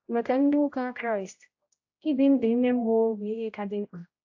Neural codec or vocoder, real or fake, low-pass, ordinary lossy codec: codec, 16 kHz, 0.5 kbps, X-Codec, HuBERT features, trained on general audio; fake; 7.2 kHz; AAC, 48 kbps